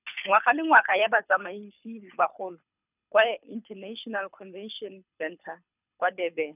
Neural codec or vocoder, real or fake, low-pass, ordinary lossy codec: codec, 24 kHz, 6 kbps, HILCodec; fake; 3.6 kHz; none